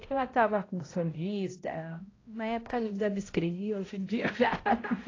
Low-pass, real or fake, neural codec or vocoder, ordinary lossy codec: 7.2 kHz; fake; codec, 16 kHz, 0.5 kbps, X-Codec, HuBERT features, trained on balanced general audio; AAC, 32 kbps